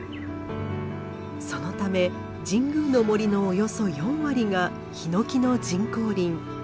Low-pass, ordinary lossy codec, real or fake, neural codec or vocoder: none; none; real; none